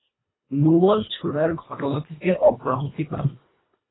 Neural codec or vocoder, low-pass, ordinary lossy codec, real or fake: codec, 24 kHz, 1.5 kbps, HILCodec; 7.2 kHz; AAC, 16 kbps; fake